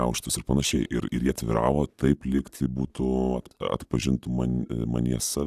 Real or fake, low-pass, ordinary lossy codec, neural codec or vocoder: real; 14.4 kHz; AAC, 96 kbps; none